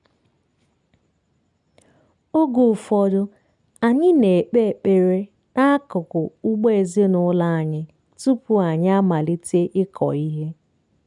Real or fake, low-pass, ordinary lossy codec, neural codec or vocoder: real; 10.8 kHz; none; none